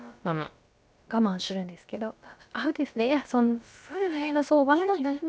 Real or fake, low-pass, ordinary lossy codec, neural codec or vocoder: fake; none; none; codec, 16 kHz, about 1 kbps, DyCAST, with the encoder's durations